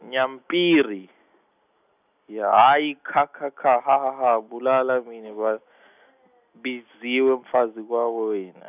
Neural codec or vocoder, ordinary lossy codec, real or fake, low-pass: none; none; real; 3.6 kHz